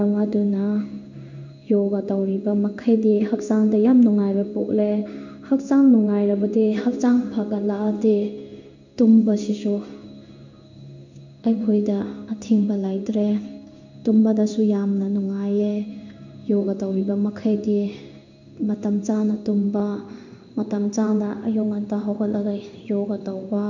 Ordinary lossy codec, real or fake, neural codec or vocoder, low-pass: none; fake; codec, 16 kHz in and 24 kHz out, 1 kbps, XY-Tokenizer; 7.2 kHz